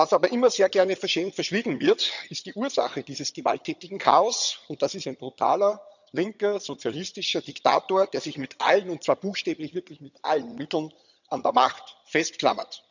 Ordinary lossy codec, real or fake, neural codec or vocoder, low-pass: none; fake; vocoder, 22.05 kHz, 80 mel bands, HiFi-GAN; 7.2 kHz